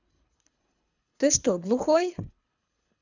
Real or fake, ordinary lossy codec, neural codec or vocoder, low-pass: fake; none; codec, 24 kHz, 3 kbps, HILCodec; 7.2 kHz